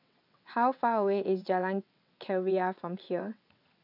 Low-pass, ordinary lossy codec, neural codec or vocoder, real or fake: 5.4 kHz; none; vocoder, 22.05 kHz, 80 mel bands, WaveNeXt; fake